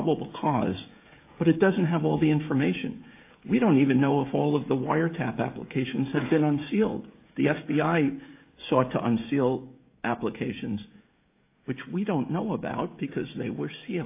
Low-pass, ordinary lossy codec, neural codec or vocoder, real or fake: 3.6 kHz; AAC, 24 kbps; codec, 16 kHz, 16 kbps, FreqCodec, smaller model; fake